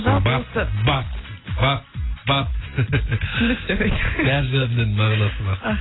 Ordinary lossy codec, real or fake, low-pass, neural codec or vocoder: AAC, 16 kbps; real; 7.2 kHz; none